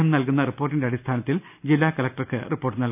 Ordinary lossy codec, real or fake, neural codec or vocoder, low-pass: none; real; none; 3.6 kHz